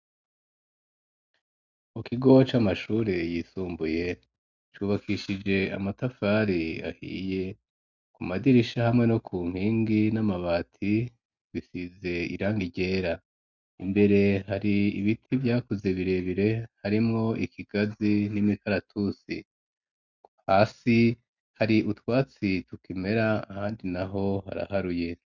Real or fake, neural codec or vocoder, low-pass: real; none; 7.2 kHz